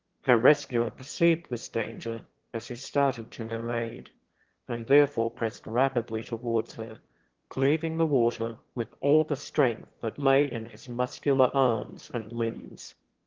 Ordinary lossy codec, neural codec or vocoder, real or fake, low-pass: Opus, 16 kbps; autoencoder, 22.05 kHz, a latent of 192 numbers a frame, VITS, trained on one speaker; fake; 7.2 kHz